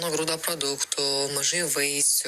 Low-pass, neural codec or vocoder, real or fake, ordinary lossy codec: 14.4 kHz; none; real; Opus, 64 kbps